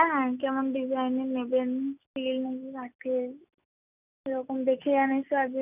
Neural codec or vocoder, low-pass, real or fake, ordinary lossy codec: none; 3.6 kHz; real; none